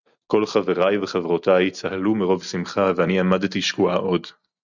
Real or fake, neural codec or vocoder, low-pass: real; none; 7.2 kHz